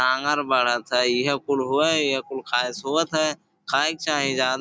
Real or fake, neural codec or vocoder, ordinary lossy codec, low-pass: real; none; none; none